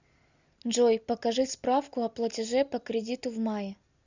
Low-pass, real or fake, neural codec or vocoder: 7.2 kHz; real; none